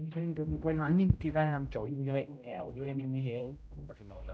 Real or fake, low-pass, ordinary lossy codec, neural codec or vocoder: fake; none; none; codec, 16 kHz, 0.5 kbps, X-Codec, HuBERT features, trained on general audio